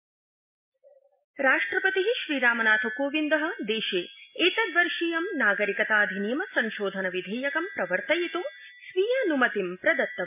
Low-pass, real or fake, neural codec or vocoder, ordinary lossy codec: 3.6 kHz; real; none; MP3, 32 kbps